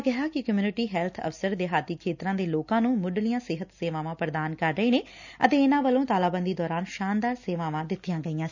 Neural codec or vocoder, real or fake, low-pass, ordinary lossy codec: none; real; 7.2 kHz; none